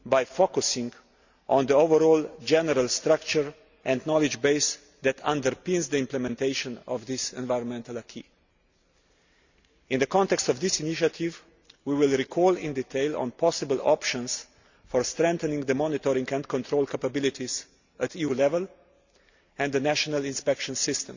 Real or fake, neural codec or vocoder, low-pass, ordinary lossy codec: real; none; 7.2 kHz; Opus, 64 kbps